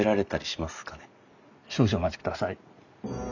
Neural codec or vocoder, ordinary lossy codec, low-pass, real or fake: none; none; 7.2 kHz; real